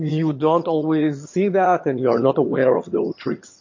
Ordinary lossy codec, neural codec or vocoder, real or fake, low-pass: MP3, 32 kbps; vocoder, 22.05 kHz, 80 mel bands, HiFi-GAN; fake; 7.2 kHz